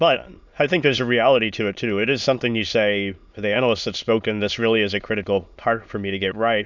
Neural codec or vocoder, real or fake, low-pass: autoencoder, 22.05 kHz, a latent of 192 numbers a frame, VITS, trained on many speakers; fake; 7.2 kHz